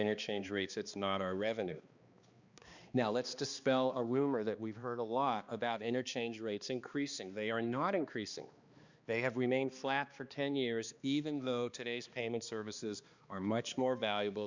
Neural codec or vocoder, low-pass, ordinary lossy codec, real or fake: codec, 16 kHz, 2 kbps, X-Codec, HuBERT features, trained on balanced general audio; 7.2 kHz; Opus, 64 kbps; fake